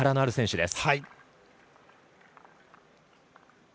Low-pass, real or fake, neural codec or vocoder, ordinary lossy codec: none; real; none; none